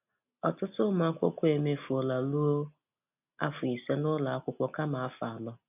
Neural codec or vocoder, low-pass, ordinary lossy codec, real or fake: none; 3.6 kHz; none; real